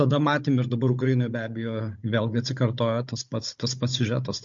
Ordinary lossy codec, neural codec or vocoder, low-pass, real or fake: MP3, 48 kbps; codec, 16 kHz, 16 kbps, FunCodec, trained on Chinese and English, 50 frames a second; 7.2 kHz; fake